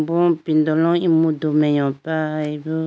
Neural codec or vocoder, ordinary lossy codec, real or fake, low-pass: none; none; real; none